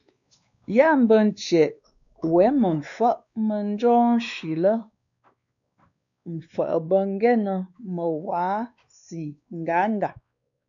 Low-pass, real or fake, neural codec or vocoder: 7.2 kHz; fake; codec, 16 kHz, 2 kbps, X-Codec, WavLM features, trained on Multilingual LibriSpeech